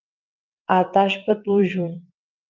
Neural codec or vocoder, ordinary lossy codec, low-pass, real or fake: vocoder, 22.05 kHz, 80 mel bands, WaveNeXt; Opus, 24 kbps; 7.2 kHz; fake